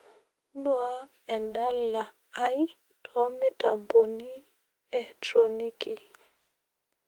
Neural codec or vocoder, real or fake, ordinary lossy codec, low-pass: autoencoder, 48 kHz, 32 numbers a frame, DAC-VAE, trained on Japanese speech; fake; Opus, 24 kbps; 19.8 kHz